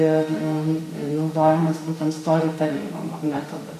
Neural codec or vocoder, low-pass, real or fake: autoencoder, 48 kHz, 32 numbers a frame, DAC-VAE, trained on Japanese speech; 14.4 kHz; fake